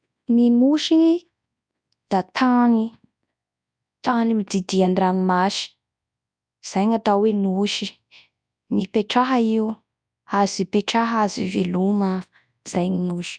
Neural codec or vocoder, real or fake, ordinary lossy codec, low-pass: codec, 24 kHz, 0.9 kbps, WavTokenizer, large speech release; fake; none; 9.9 kHz